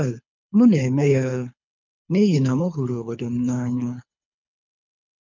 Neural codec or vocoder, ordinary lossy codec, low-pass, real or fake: codec, 24 kHz, 3 kbps, HILCodec; none; 7.2 kHz; fake